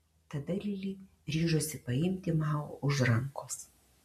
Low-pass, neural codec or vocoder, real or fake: 14.4 kHz; none; real